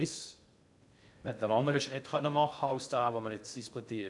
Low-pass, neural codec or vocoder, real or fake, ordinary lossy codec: 10.8 kHz; codec, 16 kHz in and 24 kHz out, 0.6 kbps, FocalCodec, streaming, 2048 codes; fake; none